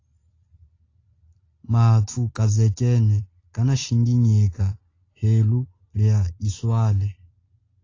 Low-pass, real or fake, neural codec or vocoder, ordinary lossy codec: 7.2 kHz; real; none; AAC, 32 kbps